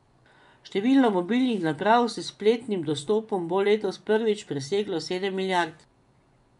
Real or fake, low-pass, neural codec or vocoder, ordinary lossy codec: fake; 10.8 kHz; vocoder, 24 kHz, 100 mel bands, Vocos; none